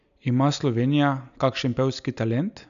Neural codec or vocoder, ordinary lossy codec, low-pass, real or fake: none; none; 7.2 kHz; real